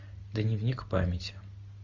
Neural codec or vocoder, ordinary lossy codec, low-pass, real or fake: none; MP3, 48 kbps; 7.2 kHz; real